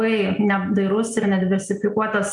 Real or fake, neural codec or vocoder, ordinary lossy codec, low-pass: real; none; MP3, 96 kbps; 10.8 kHz